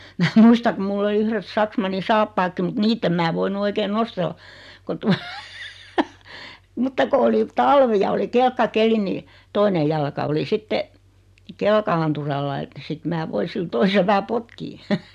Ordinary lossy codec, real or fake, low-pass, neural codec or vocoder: none; real; 14.4 kHz; none